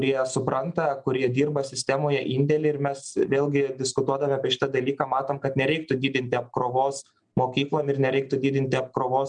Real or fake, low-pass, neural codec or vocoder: real; 9.9 kHz; none